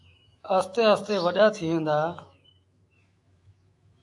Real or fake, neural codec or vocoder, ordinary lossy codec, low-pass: fake; autoencoder, 48 kHz, 128 numbers a frame, DAC-VAE, trained on Japanese speech; AAC, 64 kbps; 10.8 kHz